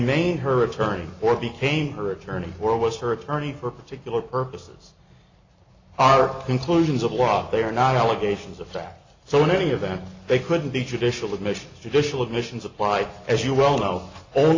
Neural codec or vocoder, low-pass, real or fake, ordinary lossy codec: none; 7.2 kHz; real; AAC, 48 kbps